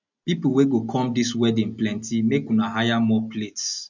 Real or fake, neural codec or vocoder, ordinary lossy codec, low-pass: real; none; none; 7.2 kHz